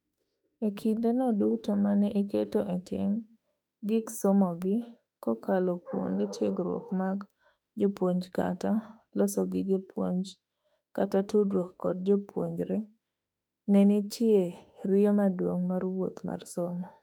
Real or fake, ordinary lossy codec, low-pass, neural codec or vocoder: fake; none; 19.8 kHz; autoencoder, 48 kHz, 32 numbers a frame, DAC-VAE, trained on Japanese speech